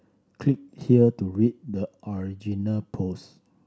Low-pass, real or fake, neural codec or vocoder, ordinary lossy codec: none; real; none; none